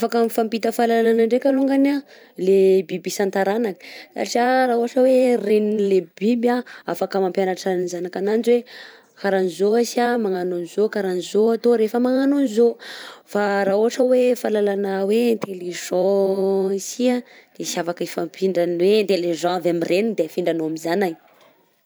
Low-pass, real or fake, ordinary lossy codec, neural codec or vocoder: none; fake; none; vocoder, 44.1 kHz, 128 mel bands every 512 samples, BigVGAN v2